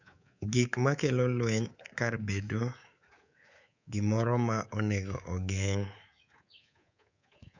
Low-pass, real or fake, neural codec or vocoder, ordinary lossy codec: 7.2 kHz; fake; codec, 24 kHz, 3.1 kbps, DualCodec; none